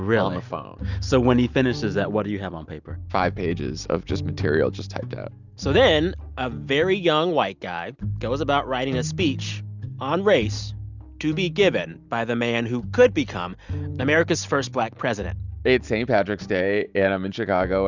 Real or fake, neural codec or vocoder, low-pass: real; none; 7.2 kHz